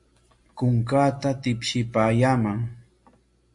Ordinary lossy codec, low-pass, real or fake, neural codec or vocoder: MP3, 48 kbps; 10.8 kHz; real; none